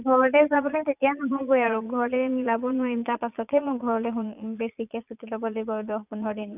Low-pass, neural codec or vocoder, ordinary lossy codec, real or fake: 3.6 kHz; vocoder, 22.05 kHz, 80 mel bands, Vocos; none; fake